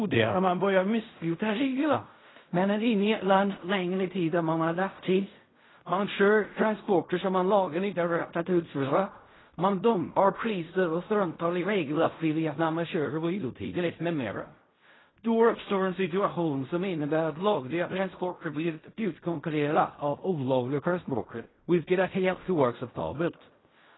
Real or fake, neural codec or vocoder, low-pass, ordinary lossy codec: fake; codec, 16 kHz in and 24 kHz out, 0.4 kbps, LongCat-Audio-Codec, fine tuned four codebook decoder; 7.2 kHz; AAC, 16 kbps